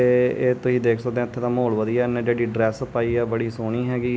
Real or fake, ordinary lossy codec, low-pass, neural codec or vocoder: real; none; none; none